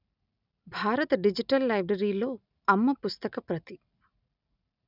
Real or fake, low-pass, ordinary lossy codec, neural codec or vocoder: real; 5.4 kHz; none; none